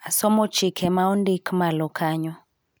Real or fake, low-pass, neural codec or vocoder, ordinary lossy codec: real; none; none; none